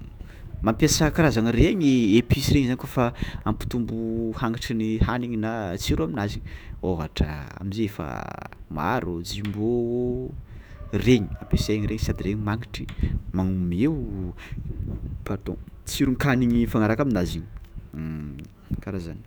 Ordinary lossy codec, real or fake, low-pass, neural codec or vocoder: none; fake; none; autoencoder, 48 kHz, 128 numbers a frame, DAC-VAE, trained on Japanese speech